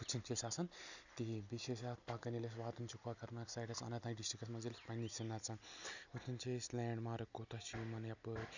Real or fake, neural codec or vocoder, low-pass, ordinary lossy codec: real; none; 7.2 kHz; none